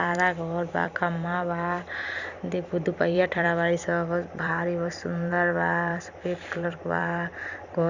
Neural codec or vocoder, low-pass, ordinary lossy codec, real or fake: vocoder, 44.1 kHz, 128 mel bands every 256 samples, BigVGAN v2; 7.2 kHz; Opus, 64 kbps; fake